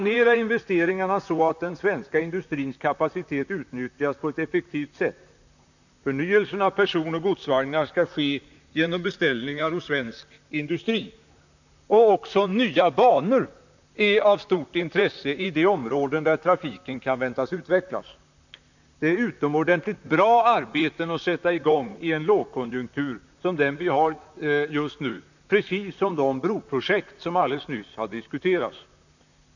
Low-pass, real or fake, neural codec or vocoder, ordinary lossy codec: 7.2 kHz; fake; vocoder, 44.1 kHz, 128 mel bands, Pupu-Vocoder; none